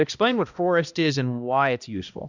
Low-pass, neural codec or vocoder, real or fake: 7.2 kHz; codec, 16 kHz, 1 kbps, X-Codec, HuBERT features, trained on balanced general audio; fake